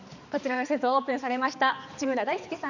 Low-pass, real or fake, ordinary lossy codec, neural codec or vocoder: 7.2 kHz; fake; none; codec, 16 kHz, 4 kbps, X-Codec, HuBERT features, trained on balanced general audio